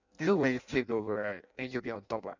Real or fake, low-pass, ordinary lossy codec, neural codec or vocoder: fake; 7.2 kHz; none; codec, 16 kHz in and 24 kHz out, 0.6 kbps, FireRedTTS-2 codec